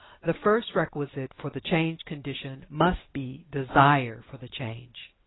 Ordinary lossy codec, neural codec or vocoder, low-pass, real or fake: AAC, 16 kbps; none; 7.2 kHz; real